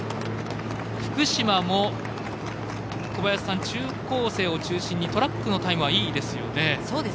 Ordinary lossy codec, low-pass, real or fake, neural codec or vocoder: none; none; real; none